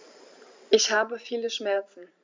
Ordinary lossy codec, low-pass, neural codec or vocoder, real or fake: MP3, 64 kbps; 7.2 kHz; vocoder, 44.1 kHz, 128 mel bands every 512 samples, BigVGAN v2; fake